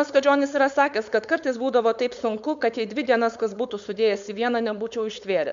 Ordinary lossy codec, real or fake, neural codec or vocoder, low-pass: MP3, 64 kbps; fake; codec, 16 kHz, 4.8 kbps, FACodec; 7.2 kHz